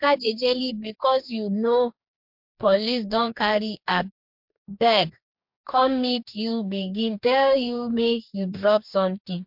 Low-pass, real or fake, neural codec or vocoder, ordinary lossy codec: 5.4 kHz; fake; codec, 44.1 kHz, 2.6 kbps, DAC; MP3, 48 kbps